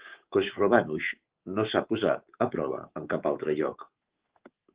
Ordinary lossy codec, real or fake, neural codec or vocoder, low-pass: Opus, 16 kbps; fake; codec, 24 kHz, 3.1 kbps, DualCodec; 3.6 kHz